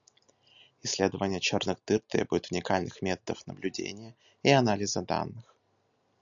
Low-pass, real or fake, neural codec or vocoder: 7.2 kHz; real; none